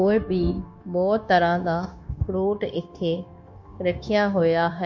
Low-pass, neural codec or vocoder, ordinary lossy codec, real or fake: 7.2 kHz; codec, 16 kHz, 0.9 kbps, LongCat-Audio-Codec; MP3, 48 kbps; fake